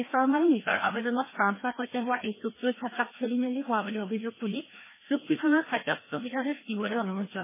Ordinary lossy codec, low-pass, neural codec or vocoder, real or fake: MP3, 16 kbps; 3.6 kHz; codec, 16 kHz, 1 kbps, FreqCodec, larger model; fake